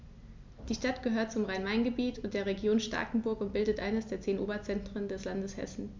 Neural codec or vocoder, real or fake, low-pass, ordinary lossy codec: none; real; 7.2 kHz; MP3, 64 kbps